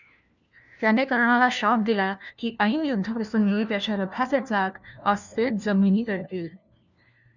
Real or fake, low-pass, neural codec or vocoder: fake; 7.2 kHz; codec, 16 kHz, 1 kbps, FunCodec, trained on LibriTTS, 50 frames a second